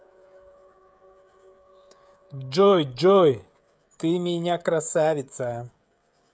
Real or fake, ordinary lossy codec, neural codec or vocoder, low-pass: fake; none; codec, 16 kHz, 16 kbps, FreqCodec, smaller model; none